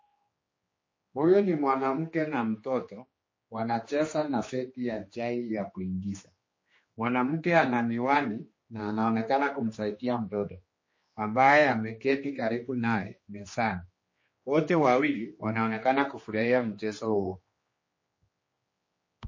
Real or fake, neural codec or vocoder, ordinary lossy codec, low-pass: fake; codec, 16 kHz, 2 kbps, X-Codec, HuBERT features, trained on general audio; MP3, 32 kbps; 7.2 kHz